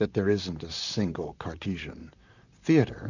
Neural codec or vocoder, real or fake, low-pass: vocoder, 44.1 kHz, 128 mel bands, Pupu-Vocoder; fake; 7.2 kHz